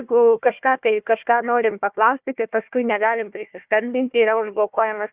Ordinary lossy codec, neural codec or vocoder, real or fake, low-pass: Opus, 64 kbps; codec, 16 kHz, 1 kbps, FunCodec, trained on Chinese and English, 50 frames a second; fake; 3.6 kHz